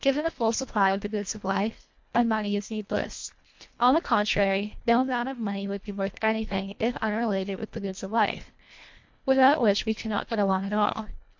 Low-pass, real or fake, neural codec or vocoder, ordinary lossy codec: 7.2 kHz; fake; codec, 24 kHz, 1.5 kbps, HILCodec; MP3, 48 kbps